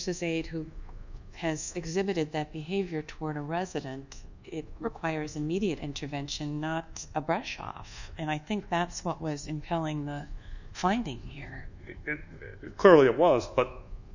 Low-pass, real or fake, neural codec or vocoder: 7.2 kHz; fake; codec, 24 kHz, 1.2 kbps, DualCodec